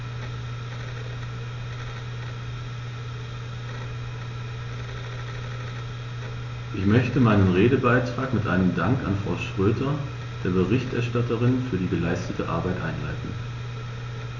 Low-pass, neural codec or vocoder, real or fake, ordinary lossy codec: 7.2 kHz; none; real; none